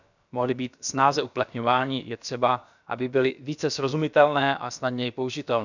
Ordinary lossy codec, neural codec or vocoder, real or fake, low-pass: none; codec, 16 kHz, about 1 kbps, DyCAST, with the encoder's durations; fake; 7.2 kHz